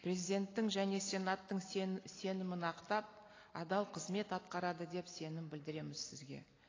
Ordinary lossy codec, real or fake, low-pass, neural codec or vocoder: AAC, 32 kbps; real; 7.2 kHz; none